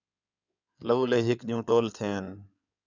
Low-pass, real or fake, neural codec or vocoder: 7.2 kHz; fake; codec, 16 kHz in and 24 kHz out, 2.2 kbps, FireRedTTS-2 codec